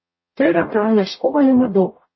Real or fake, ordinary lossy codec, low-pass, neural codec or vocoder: fake; MP3, 24 kbps; 7.2 kHz; codec, 44.1 kHz, 0.9 kbps, DAC